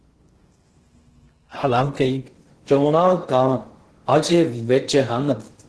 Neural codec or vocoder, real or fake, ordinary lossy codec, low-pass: codec, 16 kHz in and 24 kHz out, 0.6 kbps, FocalCodec, streaming, 2048 codes; fake; Opus, 16 kbps; 10.8 kHz